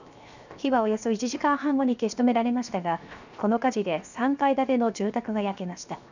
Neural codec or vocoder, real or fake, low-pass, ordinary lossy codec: codec, 16 kHz, 0.7 kbps, FocalCodec; fake; 7.2 kHz; none